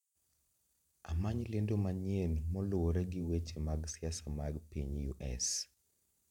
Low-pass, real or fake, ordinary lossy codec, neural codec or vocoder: 19.8 kHz; fake; none; vocoder, 44.1 kHz, 128 mel bands every 512 samples, BigVGAN v2